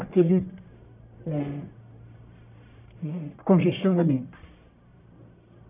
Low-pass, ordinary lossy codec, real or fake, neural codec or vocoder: 3.6 kHz; none; fake; codec, 44.1 kHz, 1.7 kbps, Pupu-Codec